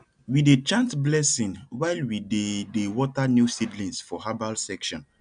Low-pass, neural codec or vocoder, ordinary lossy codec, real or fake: 9.9 kHz; none; none; real